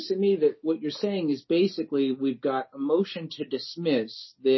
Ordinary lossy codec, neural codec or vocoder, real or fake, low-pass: MP3, 24 kbps; none; real; 7.2 kHz